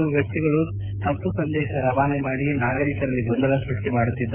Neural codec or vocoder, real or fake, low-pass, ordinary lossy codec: codec, 16 kHz, 8 kbps, FreqCodec, smaller model; fake; 3.6 kHz; none